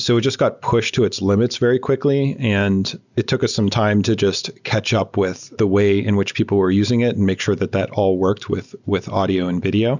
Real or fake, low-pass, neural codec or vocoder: real; 7.2 kHz; none